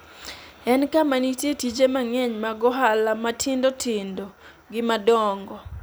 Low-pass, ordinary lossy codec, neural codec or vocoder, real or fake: none; none; none; real